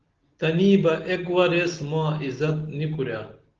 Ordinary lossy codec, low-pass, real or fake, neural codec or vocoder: Opus, 16 kbps; 7.2 kHz; real; none